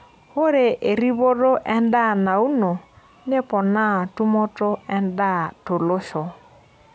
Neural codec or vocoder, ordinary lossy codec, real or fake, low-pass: none; none; real; none